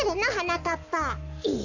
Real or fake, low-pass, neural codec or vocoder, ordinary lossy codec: fake; 7.2 kHz; codec, 44.1 kHz, 7.8 kbps, Pupu-Codec; none